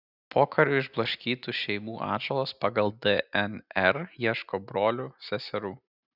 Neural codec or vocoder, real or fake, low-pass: none; real; 5.4 kHz